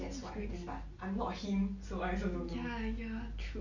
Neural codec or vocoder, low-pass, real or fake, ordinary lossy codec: none; 7.2 kHz; real; MP3, 48 kbps